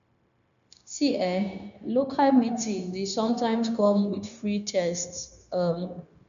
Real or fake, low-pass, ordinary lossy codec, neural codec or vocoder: fake; 7.2 kHz; none; codec, 16 kHz, 0.9 kbps, LongCat-Audio-Codec